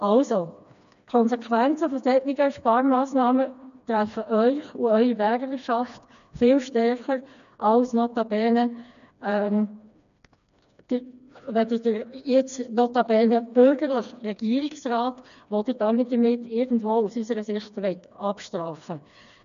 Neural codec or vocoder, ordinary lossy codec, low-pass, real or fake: codec, 16 kHz, 2 kbps, FreqCodec, smaller model; none; 7.2 kHz; fake